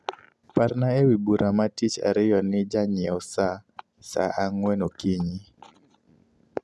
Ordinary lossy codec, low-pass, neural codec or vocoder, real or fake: none; none; none; real